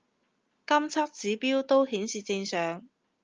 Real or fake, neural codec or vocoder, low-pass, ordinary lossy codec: real; none; 7.2 kHz; Opus, 24 kbps